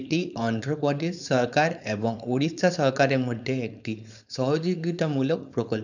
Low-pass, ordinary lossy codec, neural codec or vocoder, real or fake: 7.2 kHz; none; codec, 16 kHz, 4.8 kbps, FACodec; fake